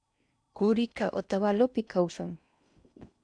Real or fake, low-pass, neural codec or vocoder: fake; 9.9 kHz; codec, 16 kHz in and 24 kHz out, 0.6 kbps, FocalCodec, streaming, 4096 codes